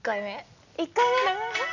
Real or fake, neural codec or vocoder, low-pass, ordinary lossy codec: real; none; 7.2 kHz; none